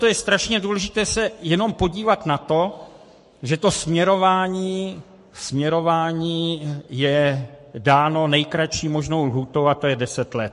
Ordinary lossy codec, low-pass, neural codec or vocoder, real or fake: MP3, 48 kbps; 14.4 kHz; codec, 44.1 kHz, 7.8 kbps, Pupu-Codec; fake